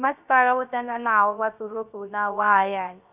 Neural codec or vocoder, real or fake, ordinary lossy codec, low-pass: codec, 16 kHz, 0.3 kbps, FocalCodec; fake; none; 3.6 kHz